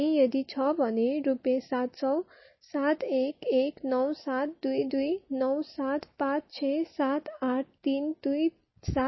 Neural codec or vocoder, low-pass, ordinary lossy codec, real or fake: none; 7.2 kHz; MP3, 24 kbps; real